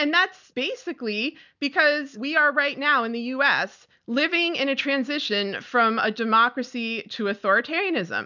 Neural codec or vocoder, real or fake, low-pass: none; real; 7.2 kHz